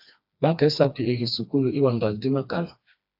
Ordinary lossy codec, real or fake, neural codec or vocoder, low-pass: AAC, 48 kbps; fake; codec, 16 kHz, 2 kbps, FreqCodec, smaller model; 5.4 kHz